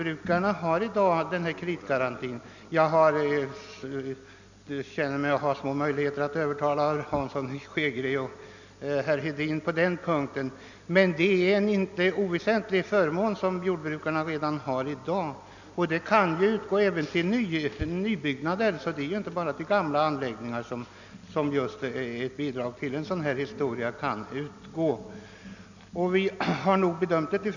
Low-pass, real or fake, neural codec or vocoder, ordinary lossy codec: 7.2 kHz; real; none; none